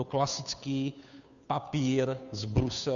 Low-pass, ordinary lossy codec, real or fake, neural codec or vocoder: 7.2 kHz; MP3, 96 kbps; fake; codec, 16 kHz, 2 kbps, FunCodec, trained on Chinese and English, 25 frames a second